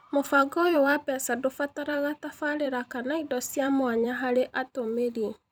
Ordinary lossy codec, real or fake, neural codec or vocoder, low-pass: none; fake; vocoder, 44.1 kHz, 128 mel bands every 256 samples, BigVGAN v2; none